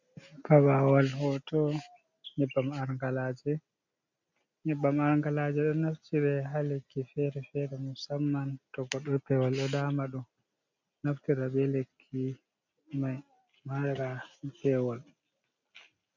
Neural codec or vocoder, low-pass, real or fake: none; 7.2 kHz; real